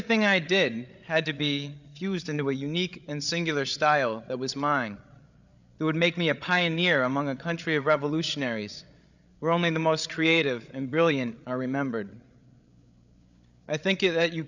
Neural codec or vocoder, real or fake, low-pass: codec, 16 kHz, 8 kbps, FreqCodec, larger model; fake; 7.2 kHz